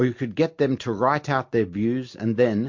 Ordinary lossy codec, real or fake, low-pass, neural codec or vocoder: MP3, 48 kbps; real; 7.2 kHz; none